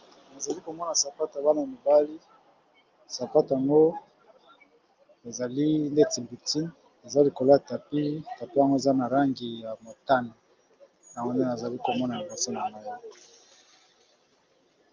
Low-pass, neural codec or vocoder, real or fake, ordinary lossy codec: 7.2 kHz; none; real; Opus, 32 kbps